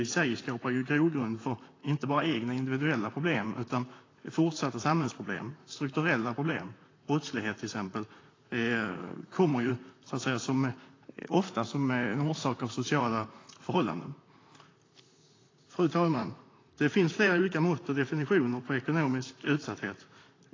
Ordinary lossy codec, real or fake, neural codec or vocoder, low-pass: AAC, 32 kbps; fake; vocoder, 44.1 kHz, 128 mel bands, Pupu-Vocoder; 7.2 kHz